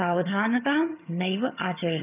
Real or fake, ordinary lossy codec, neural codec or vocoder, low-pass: fake; none; vocoder, 22.05 kHz, 80 mel bands, HiFi-GAN; 3.6 kHz